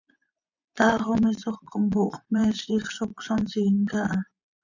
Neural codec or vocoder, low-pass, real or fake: vocoder, 24 kHz, 100 mel bands, Vocos; 7.2 kHz; fake